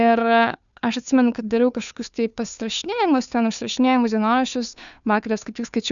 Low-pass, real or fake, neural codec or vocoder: 7.2 kHz; fake; codec, 16 kHz, 2 kbps, FunCodec, trained on Chinese and English, 25 frames a second